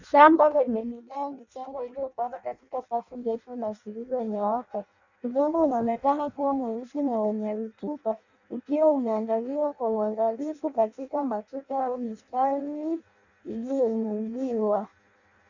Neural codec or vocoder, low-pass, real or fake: codec, 16 kHz in and 24 kHz out, 0.6 kbps, FireRedTTS-2 codec; 7.2 kHz; fake